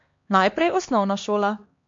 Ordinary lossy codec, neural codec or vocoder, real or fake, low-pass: MP3, 48 kbps; codec, 16 kHz, 2 kbps, X-Codec, HuBERT features, trained on LibriSpeech; fake; 7.2 kHz